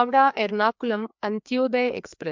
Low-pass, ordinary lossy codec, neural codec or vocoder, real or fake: 7.2 kHz; MP3, 64 kbps; codec, 16 kHz, 2 kbps, FreqCodec, larger model; fake